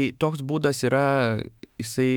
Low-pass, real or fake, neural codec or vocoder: 19.8 kHz; fake; autoencoder, 48 kHz, 32 numbers a frame, DAC-VAE, trained on Japanese speech